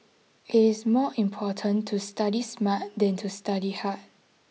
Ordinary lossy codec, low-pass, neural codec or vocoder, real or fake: none; none; none; real